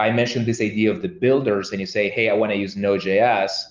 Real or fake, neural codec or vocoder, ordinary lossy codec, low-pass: real; none; Opus, 24 kbps; 7.2 kHz